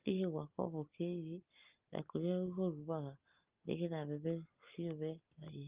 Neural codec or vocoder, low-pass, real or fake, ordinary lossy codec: none; 3.6 kHz; real; Opus, 24 kbps